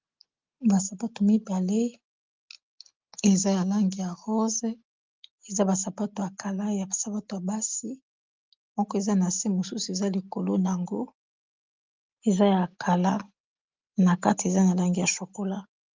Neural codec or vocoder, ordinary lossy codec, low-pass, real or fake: none; Opus, 32 kbps; 7.2 kHz; real